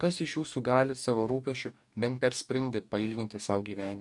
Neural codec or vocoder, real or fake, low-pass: codec, 44.1 kHz, 2.6 kbps, DAC; fake; 10.8 kHz